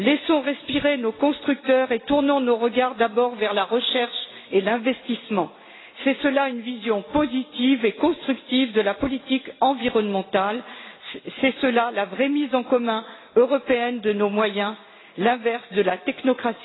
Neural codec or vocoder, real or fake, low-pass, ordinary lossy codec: none; real; 7.2 kHz; AAC, 16 kbps